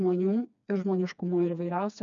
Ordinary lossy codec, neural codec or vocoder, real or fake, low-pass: MP3, 96 kbps; codec, 16 kHz, 2 kbps, FreqCodec, smaller model; fake; 7.2 kHz